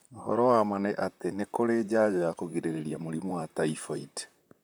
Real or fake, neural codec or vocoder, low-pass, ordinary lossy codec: fake; vocoder, 44.1 kHz, 128 mel bands, Pupu-Vocoder; none; none